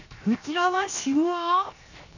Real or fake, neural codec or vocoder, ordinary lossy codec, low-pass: fake; codec, 16 kHz, 0.7 kbps, FocalCodec; none; 7.2 kHz